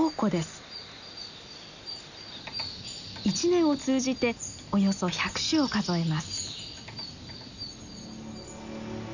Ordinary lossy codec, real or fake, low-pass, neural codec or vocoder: none; real; 7.2 kHz; none